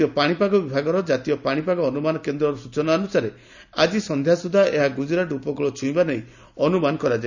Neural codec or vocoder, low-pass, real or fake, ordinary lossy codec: none; 7.2 kHz; real; none